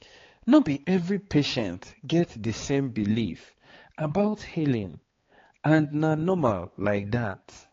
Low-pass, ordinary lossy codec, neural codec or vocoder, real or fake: 7.2 kHz; AAC, 32 kbps; codec, 16 kHz, 4 kbps, X-Codec, HuBERT features, trained on balanced general audio; fake